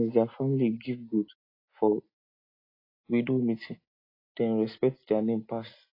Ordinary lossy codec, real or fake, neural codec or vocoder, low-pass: AAC, 24 kbps; real; none; 5.4 kHz